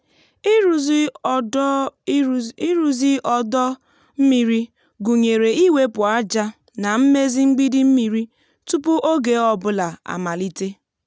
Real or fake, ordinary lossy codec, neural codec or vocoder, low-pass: real; none; none; none